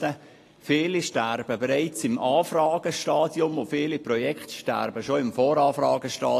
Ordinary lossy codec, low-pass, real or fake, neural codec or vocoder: AAC, 48 kbps; 14.4 kHz; real; none